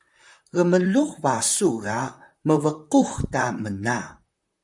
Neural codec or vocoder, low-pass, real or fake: vocoder, 44.1 kHz, 128 mel bands, Pupu-Vocoder; 10.8 kHz; fake